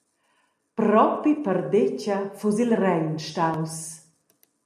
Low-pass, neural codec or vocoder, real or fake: 14.4 kHz; none; real